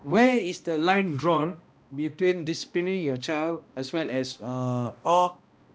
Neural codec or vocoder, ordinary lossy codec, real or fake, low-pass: codec, 16 kHz, 1 kbps, X-Codec, HuBERT features, trained on balanced general audio; none; fake; none